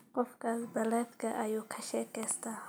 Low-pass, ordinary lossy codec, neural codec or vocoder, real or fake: none; none; none; real